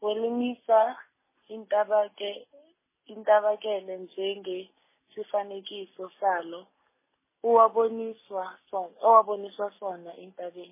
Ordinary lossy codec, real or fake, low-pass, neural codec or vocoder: MP3, 16 kbps; real; 3.6 kHz; none